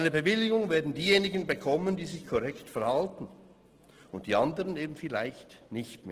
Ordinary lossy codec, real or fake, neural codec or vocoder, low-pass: Opus, 16 kbps; real; none; 14.4 kHz